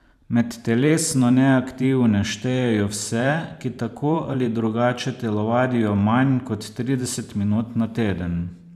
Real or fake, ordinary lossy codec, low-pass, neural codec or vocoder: fake; none; 14.4 kHz; vocoder, 44.1 kHz, 128 mel bands every 512 samples, BigVGAN v2